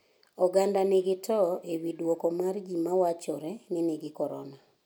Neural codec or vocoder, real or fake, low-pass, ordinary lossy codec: none; real; none; none